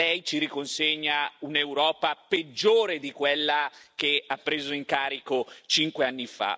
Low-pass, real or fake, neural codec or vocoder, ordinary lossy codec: none; real; none; none